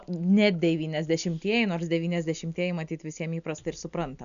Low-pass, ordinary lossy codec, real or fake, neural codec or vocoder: 7.2 kHz; MP3, 96 kbps; real; none